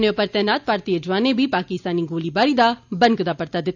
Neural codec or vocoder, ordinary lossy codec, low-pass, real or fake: none; none; 7.2 kHz; real